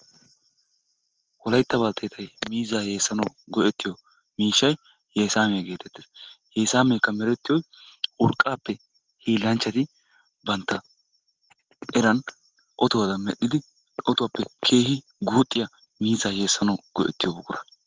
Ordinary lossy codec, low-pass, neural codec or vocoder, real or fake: Opus, 16 kbps; 7.2 kHz; none; real